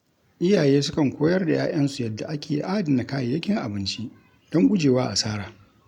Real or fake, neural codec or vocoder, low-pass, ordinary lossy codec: real; none; 19.8 kHz; none